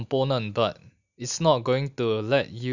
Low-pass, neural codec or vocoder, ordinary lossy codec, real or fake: 7.2 kHz; none; none; real